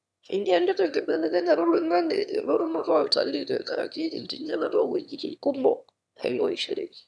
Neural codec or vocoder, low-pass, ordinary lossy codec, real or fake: autoencoder, 22.05 kHz, a latent of 192 numbers a frame, VITS, trained on one speaker; none; none; fake